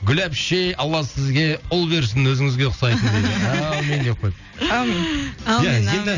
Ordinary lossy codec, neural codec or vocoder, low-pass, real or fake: none; none; 7.2 kHz; real